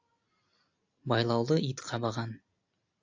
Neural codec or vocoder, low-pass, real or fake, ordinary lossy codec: none; 7.2 kHz; real; AAC, 48 kbps